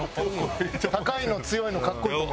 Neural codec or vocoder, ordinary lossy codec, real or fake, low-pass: none; none; real; none